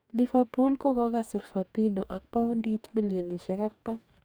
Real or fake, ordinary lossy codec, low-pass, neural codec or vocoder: fake; none; none; codec, 44.1 kHz, 2.6 kbps, DAC